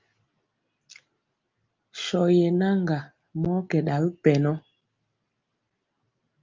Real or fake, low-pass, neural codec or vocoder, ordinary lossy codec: real; 7.2 kHz; none; Opus, 32 kbps